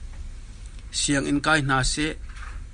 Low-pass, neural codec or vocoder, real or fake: 9.9 kHz; none; real